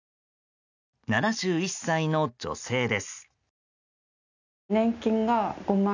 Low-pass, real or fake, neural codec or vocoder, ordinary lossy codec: 7.2 kHz; real; none; none